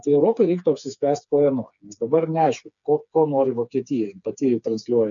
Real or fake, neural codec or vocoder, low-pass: fake; codec, 16 kHz, 4 kbps, FreqCodec, smaller model; 7.2 kHz